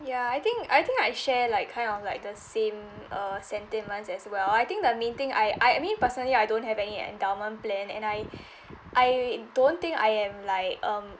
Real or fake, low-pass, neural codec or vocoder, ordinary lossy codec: real; none; none; none